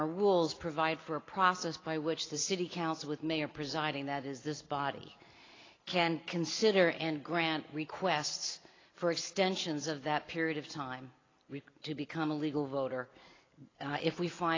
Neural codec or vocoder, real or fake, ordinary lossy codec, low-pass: none; real; AAC, 32 kbps; 7.2 kHz